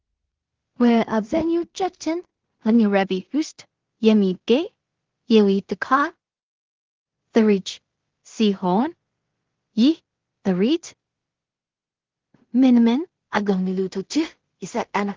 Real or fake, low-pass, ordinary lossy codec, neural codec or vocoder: fake; 7.2 kHz; Opus, 16 kbps; codec, 16 kHz in and 24 kHz out, 0.4 kbps, LongCat-Audio-Codec, two codebook decoder